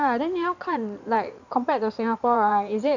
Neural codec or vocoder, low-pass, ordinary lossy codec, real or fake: codec, 16 kHz in and 24 kHz out, 2.2 kbps, FireRedTTS-2 codec; 7.2 kHz; none; fake